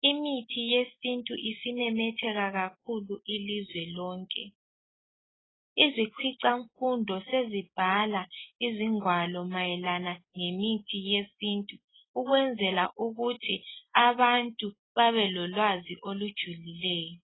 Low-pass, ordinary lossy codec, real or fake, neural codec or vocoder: 7.2 kHz; AAC, 16 kbps; real; none